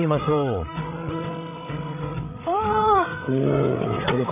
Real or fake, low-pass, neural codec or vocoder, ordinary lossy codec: fake; 3.6 kHz; codec, 16 kHz, 16 kbps, FreqCodec, larger model; none